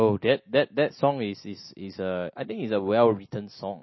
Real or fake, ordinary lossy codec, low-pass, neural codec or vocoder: real; MP3, 24 kbps; 7.2 kHz; none